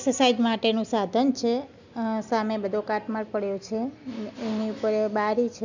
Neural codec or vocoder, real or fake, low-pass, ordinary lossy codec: none; real; 7.2 kHz; none